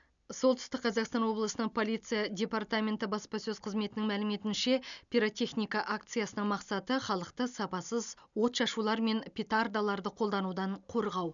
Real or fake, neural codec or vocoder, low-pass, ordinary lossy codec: real; none; 7.2 kHz; none